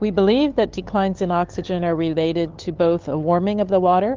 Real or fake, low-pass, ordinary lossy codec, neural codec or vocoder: fake; 7.2 kHz; Opus, 16 kbps; codec, 16 kHz, 8 kbps, FunCodec, trained on LibriTTS, 25 frames a second